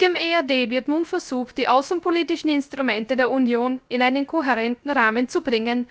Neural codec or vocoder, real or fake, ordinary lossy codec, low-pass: codec, 16 kHz, 0.3 kbps, FocalCodec; fake; none; none